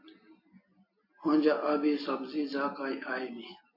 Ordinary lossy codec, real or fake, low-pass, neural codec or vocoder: MP3, 32 kbps; real; 5.4 kHz; none